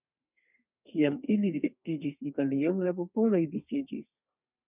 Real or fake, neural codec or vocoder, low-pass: fake; codec, 32 kHz, 1.9 kbps, SNAC; 3.6 kHz